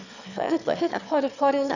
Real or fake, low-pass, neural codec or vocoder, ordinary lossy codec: fake; 7.2 kHz; autoencoder, 22.05 kHz, a latent of 192 numbers a frame, VITS, trained on one speaker; none